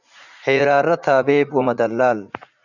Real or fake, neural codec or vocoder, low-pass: fake; vocoder, 44.1 kHz, 80 mel bands, Vocos; 7.2 kHz